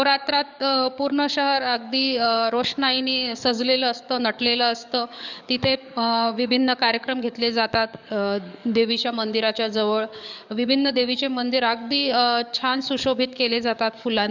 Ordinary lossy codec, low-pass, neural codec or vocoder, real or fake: none; 7.2 kHz; codec, 44.1 kHz, 7.8 kbps, DAC; fake